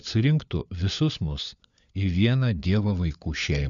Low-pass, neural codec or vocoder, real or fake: 7.2 kHz; codec, 16 kHz, 4 kbps, FunCodec, trained on LibriTTS, 50 frames a second; fake